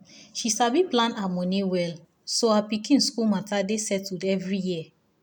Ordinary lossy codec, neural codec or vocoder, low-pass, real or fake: none; none; none; real